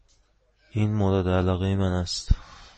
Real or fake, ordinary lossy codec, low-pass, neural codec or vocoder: real; MP3, 32 kbps; 9.9 kHz; none